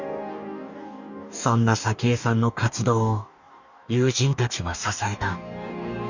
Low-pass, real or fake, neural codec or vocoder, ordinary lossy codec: 7.2 kHz; fake; codec, 44.1 kHz, 2.6 kbps, DAC; none